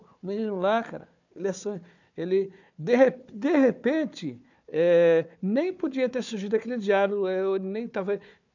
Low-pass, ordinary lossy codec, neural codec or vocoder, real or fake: 7.2 kHz; none; codec, 16 kHz, 4 kbps, FunCodec, trained on Chinese and English, 50 frames a second; fake